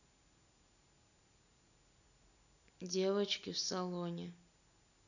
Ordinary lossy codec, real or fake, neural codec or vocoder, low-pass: none; real; none; 7.2 kHz